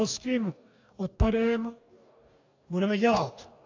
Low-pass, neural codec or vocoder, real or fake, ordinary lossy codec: 7.2 kHz; codec, 44.1 kHz, 2.6 kbps, DAC; fake; AAC, 48 kbps